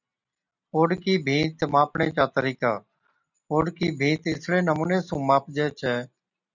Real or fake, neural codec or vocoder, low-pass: real; none; 7.2 kHz